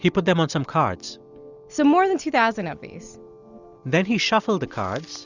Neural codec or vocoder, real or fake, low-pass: none; real; 7.2 kHz